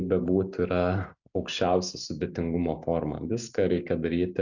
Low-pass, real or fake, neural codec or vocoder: 7.2 kHz; real; none